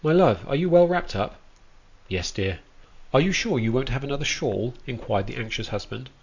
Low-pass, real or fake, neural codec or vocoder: 7.2 kHz; real; none